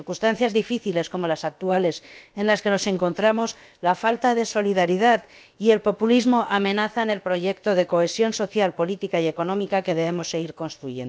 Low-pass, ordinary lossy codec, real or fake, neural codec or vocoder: none; none; fake; codec, 16 kHz, about 1 kbps, DyCAST, with the encoder's durations